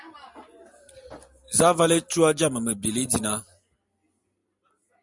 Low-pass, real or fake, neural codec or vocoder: 10.8 kHz; real; none